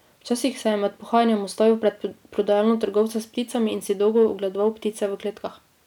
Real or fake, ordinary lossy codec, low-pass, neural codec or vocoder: real; none; 19.8 kHz; none